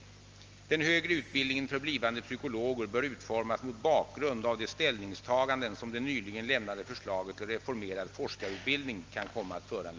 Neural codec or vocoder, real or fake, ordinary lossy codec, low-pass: none; real; Opus, 24 kbps; 7.2 kHz